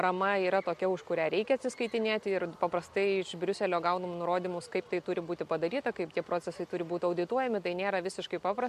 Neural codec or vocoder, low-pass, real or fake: none; 14.4 kHz; real